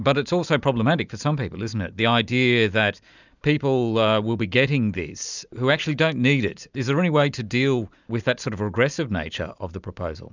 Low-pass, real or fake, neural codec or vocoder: 7.2 kHz; real; none